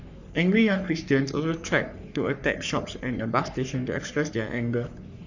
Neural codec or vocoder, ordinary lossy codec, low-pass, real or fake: codec, 44.1 kHz, 3.4 kbps, Pupu-Codec; none; 7.2 kHz; fake